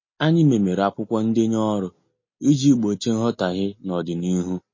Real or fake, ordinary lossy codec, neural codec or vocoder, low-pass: real; MP3, 32 kbps; none; 7.2 kHz